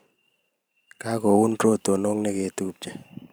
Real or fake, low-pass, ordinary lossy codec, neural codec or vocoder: fake; none; none; vocoder, 44.1 kHz, 128 mel bands every 512 samples, BigVGAN v2